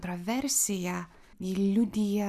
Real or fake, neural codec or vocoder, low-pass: real; none; 14.4 kHz